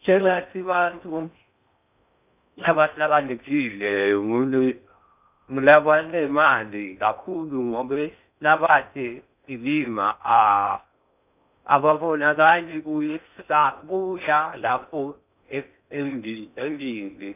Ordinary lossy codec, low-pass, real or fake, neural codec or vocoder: none; 3.6 kHz; fake; codec, 16 kHz in and 24 kHz out, 0.6 kbps, FocalCodec, streaming, 4096 codes